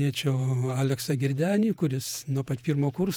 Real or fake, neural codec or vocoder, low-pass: fake; vocoder, 48 kHz, 128 mel bands, Vocos; 19.8 kHz